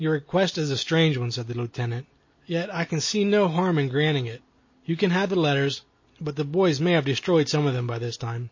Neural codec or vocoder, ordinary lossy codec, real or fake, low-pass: none; MP3, 32 kbps; real; 7.2 kHz